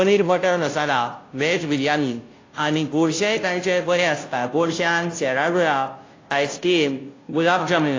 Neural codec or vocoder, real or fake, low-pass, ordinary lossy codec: codec, 16 kHz, 0.5 kbps, FunCodec, trained on Chinese and English, 25 frames a second; fake; 7.2 kHz; AAC, 32 kbps